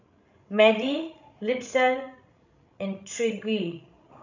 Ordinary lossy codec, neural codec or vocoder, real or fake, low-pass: none; codec, 16 kHz, 16 kbps, FreqCodec, larger model; fake; 7.2 kHz